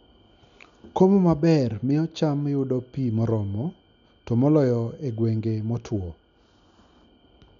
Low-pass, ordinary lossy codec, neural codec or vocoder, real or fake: 7.2 kHz; none; none; real